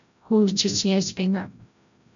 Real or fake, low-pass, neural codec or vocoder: fake; 7.2 kHz; codec, 16 kHz, 0.5 kbps, FreqCodec, larger model